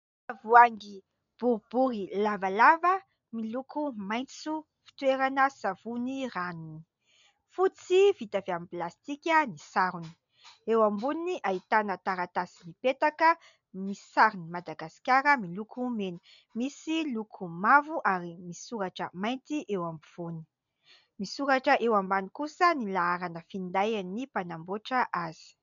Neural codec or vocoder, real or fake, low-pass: none; real; 7.2 kHz